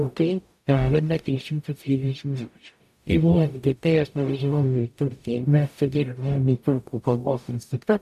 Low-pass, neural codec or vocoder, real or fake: 14.4 kHz; codec, 44.1 kHz, 0.9 kbps, DAC; fake